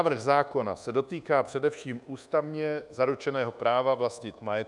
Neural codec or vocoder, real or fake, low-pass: codec, 24 kHz, 1.2 kbps, DualCodec; fake; 10.8 kHz